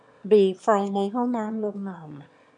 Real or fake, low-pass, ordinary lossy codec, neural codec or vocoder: fake; 9.9 kHz; none; autoencoder, 22.05 kHz, a latent of 192 numbers a frame, VITS, trained on one speaker